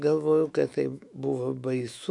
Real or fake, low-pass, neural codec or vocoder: fake; 10.8 kHz; autoencoder, 48 kHz, 128 numbers a frame, DAC-VAE, trained on Japanese speech